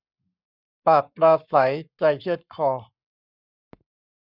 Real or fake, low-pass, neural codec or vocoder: fake; 5.4 kHz; codec, 44.1 kHz, 7.8 kbps, Pupu-Codec